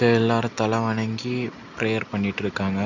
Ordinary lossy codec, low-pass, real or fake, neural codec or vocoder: none; 7.2 kHz; real; none